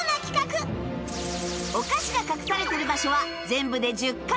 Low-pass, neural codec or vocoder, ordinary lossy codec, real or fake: none; none; none; real